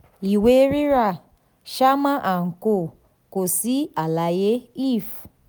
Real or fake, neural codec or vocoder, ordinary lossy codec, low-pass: real; none; none; none